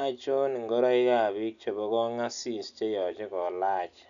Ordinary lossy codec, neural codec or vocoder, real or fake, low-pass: none; none; real; 7.2 kHz